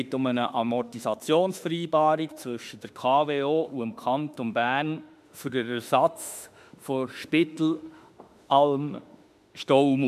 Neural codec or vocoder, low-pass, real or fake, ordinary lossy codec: autoencoder, 48 kHz, 32 numbers a frame, DAC-VAE, trained on Japanese speech; 14.4 kHz; fake; MP3, 96 kbps